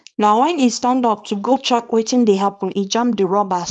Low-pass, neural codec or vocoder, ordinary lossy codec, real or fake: 9.9 kHz; codec, 24 kHz, 0.9 kbps, WavTokenizer, small release; none; fake